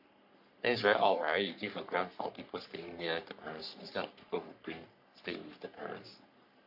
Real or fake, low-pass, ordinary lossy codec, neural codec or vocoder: fake; 5.4 kHz; AAC, 48 kbps; codec, 44.1 kHz, 3.4 kbps, Pupu-Codec